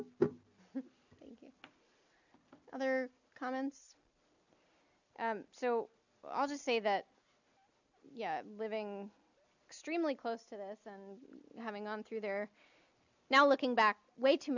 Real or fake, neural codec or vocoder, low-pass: real; none; 7.2 kHz